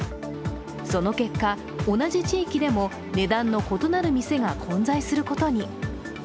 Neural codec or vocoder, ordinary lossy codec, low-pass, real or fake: none; none; none; real